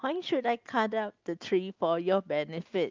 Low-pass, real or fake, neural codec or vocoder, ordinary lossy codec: 7.2 kHz; real; none; Opus, 32 kbps